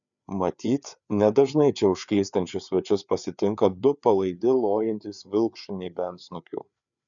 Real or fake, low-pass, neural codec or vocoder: fake; 7.2 kHz; codec, 16 kHz, 4 kbps, FreqCodec, larger model